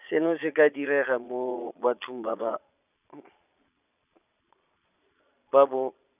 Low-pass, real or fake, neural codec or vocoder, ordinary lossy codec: 3.6 kHz; fake; vocoder, 22.05 kHz, 80 mel bands, Vocos; none